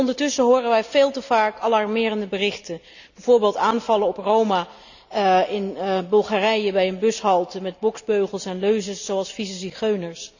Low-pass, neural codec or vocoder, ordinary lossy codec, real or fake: 7.2 kHz; none; none; real